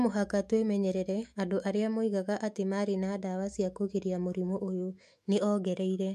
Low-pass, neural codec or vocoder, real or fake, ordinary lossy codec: 14.4 kHz; autoencoder, 48 kHz, 128 numbers a frame, DAC-VAE, trained on Japanese speech; fake; MP3, 64 kbps